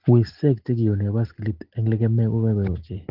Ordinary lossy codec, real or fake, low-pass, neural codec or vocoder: Opus, 16 kbps; real; 5.4 kHz; none